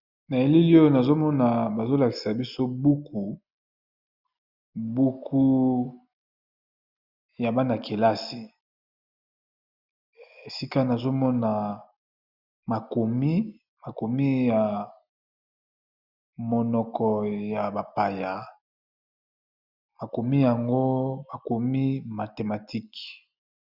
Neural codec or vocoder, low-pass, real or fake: none; 5.4 kHz; real